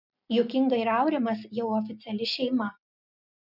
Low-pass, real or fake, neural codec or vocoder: 5.4 kHz; real; none